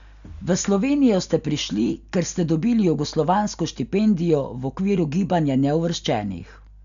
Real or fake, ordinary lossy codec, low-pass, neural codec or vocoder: real; MP3, 96 kbps; 7.2 kHz; none